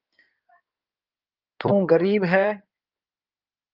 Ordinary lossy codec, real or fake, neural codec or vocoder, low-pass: Opus, 32 kbps; fake; codec, 16 kHz in and 24 kHz out, 2.2 kbps, FireRedTTS-2 codec; 5.4 kHz